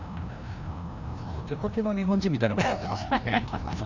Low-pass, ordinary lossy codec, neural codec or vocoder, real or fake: 7.2 kHz; none; codec, 16 kHz, 1 kbps, FreqCodec, larger model; fake